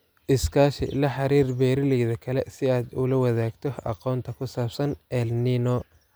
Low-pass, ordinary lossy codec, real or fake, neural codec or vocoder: none; none; real; none